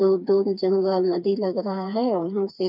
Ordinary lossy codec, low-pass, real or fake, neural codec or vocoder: none; 5.4 kHz; fake; codec, 16 kHz, 4 kbps, FreqCodec, smaller model